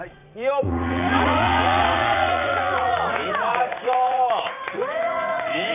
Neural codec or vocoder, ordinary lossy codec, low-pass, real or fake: vocoder, 44.1 kHz, 80 mel bands, Vocos; none; 3.6 kHz; fake